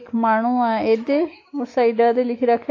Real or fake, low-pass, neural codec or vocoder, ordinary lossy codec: fake; 7.2 kHz; autoencoder, 48 kHz, 128 numbers a frame, DAC-VAE, trained on Japanese speech; AAC, 32 kbps